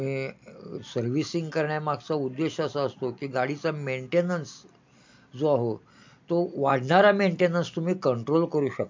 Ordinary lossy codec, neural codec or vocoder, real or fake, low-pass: MP3, 48 kbps; none; real; 7.2 kHz